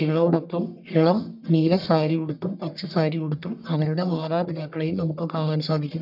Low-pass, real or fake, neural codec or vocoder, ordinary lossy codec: 5.4 kHz; fake; codec, 44.1 kHz, 1.7 kbps, Pupu-Codec; none